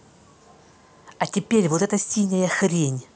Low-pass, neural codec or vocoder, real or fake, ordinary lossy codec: none; none; real; none